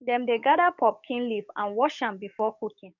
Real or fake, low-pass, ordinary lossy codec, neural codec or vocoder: fake; 7.2 kHz; none; codec, 44.1 kHz, 7.8 kbps, DAC